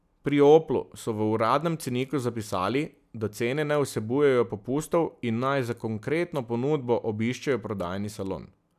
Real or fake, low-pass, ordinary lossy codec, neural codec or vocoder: real; 14.4 kHz; none; none